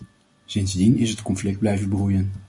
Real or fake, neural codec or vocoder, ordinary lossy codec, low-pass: real; none; MP3, 48 kbps; 10.8 kHz